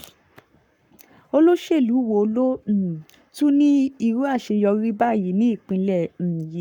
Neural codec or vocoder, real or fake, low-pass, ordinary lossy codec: codec, 44.1 kHz, 7.8 kbps, Pupu-Codec; fake; 19.8 kHz; none